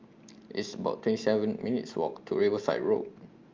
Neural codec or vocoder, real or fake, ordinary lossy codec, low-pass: none; real; Opus, 24 kbps; 7.2 kHz